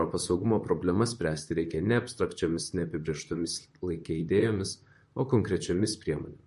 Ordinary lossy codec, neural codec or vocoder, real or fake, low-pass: MP3, 48 kbps; vocoder, 44.1 kHz, 128 mel bands, Pupu-Vocoder; fake; 14.4 kHz